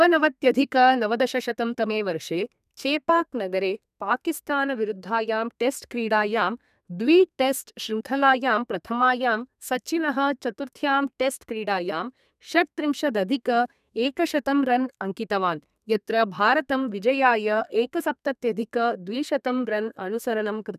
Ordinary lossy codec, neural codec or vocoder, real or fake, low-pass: none; codec, 32 kHz, 1.9 kbps, SNAC; fake; 14.4 kHz